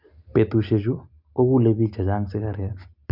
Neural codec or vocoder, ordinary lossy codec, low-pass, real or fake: none; MP3, 48 kbps; 5.4 kHz; real